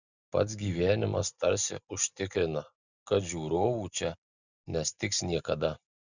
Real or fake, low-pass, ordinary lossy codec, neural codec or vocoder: real; 7.2 kHz; Opus, 64 kbps; none